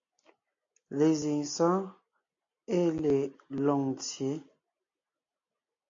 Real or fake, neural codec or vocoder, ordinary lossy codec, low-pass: real; none; AAC, 48 kbps; 7.2 kHz